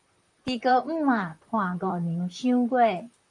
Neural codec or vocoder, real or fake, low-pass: vocoder, 44.1 kHz, 128 mel bands, Pupu-Vocoder; fake; 10.8 kHz